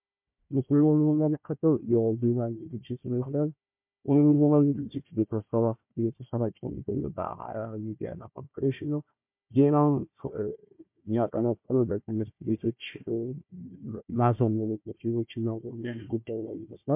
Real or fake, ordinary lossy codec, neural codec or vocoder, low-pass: fake; MP3, 32 kbps; codec, 16 kHz, 1 kbps, FunCodec, trained on Chinese and English, 50 frames a second; 3.6 kHz